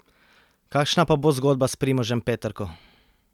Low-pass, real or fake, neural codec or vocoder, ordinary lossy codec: 19.8 kHz; real; none; none